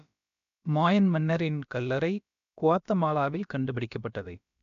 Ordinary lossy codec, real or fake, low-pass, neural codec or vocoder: none; fake; 7.2 kHz; codec, 16 kHz, about 1 kbps, DyCAST, with the encoder's durations